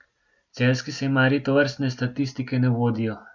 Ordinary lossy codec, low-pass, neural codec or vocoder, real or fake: none; 7.2 kHz; none; real